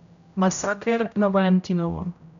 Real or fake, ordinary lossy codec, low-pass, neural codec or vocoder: fake; none; 7.2 kHz; codec, 16 kHz, 0.5 kbps, X-Codec, HuBERT features, trained on general audio